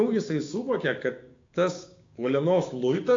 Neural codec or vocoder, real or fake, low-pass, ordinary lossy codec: codec, 16 kHz, 6 kbps, DAC; fake; 7.2 kHz; AAC, 48 kbps